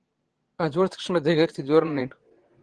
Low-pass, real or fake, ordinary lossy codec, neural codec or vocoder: 9.9 kHz; fake; Opus, 16 kbps; vocoder, 22.05 kHz, 80 mel bands, Vocos